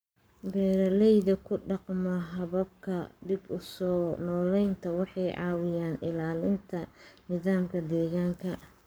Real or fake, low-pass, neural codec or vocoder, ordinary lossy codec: fake; none; codec, 44.1 kHz, 7.8 kbps, Pupu-Codec; none